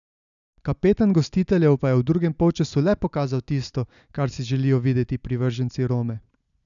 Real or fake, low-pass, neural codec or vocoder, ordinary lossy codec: real; 7.2 kHz; none; none